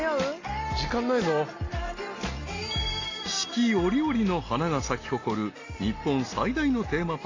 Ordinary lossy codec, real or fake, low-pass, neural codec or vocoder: AAC, 32 kbps; real; 7.2 kHz; none